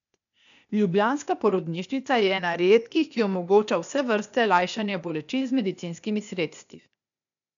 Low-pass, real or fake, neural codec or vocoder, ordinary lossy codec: 7.2 kHz; fake; codec, 16 kHz, 0.8 kbps, ZipCodec; MP3, 96 kbps